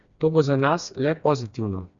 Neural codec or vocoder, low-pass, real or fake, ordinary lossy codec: codec, 16 kHz, 2 kbps, FreqCodec, smaller model; 7.2 kHz; fake; Opus, 64 kbps